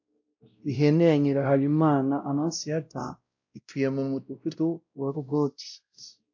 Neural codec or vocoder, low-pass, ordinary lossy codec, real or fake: codec, 16 kHz, 0.5 kbps, X-Codec, WavLM features, trained on Multilingual LibriSpeech; 7.2 kHz; AAC, 48 kbps; fake